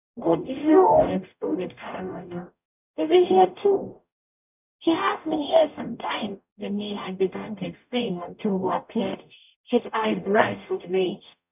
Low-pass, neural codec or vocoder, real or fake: 3.6 kHz; codec, 44.1 kHz, 0.9 kbps, DAC; fake